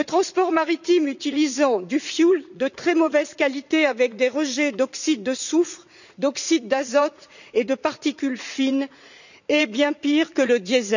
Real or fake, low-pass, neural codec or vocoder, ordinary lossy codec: fake; 7.2 kHz; vocoder, 44.1 kHz, 80 mel bands, Vocos; none